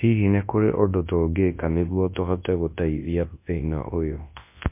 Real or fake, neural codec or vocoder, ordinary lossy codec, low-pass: fake; codec, 24 kHz, 0.9 kbps, WavTokenizer, large speech release; MP3, 24 kbps; 3.6 kHz